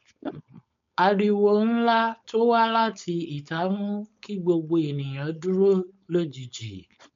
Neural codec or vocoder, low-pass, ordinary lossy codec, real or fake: codec, 16 kHz, 4.8 kbps, FACodec; 7.2 kHz; MP3, 48 kbps; fake